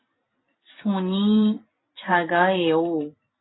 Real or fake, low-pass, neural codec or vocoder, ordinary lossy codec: fake; 7.2 kHz; vocoder, 44.1 kHz, 128 mel bands every 256 samples, BigVGAN v2; AAC, 16 kbps